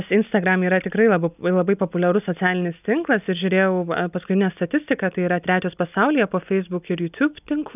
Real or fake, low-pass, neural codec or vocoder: real; 3.6 kHz; none